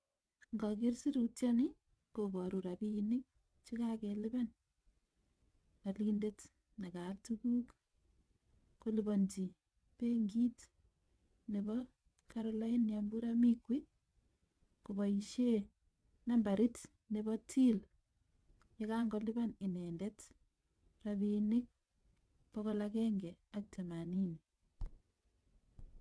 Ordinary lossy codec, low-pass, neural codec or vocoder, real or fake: none; none; vocoder, 22.05 kHz, 80 mel bands, WaveNeXt; fake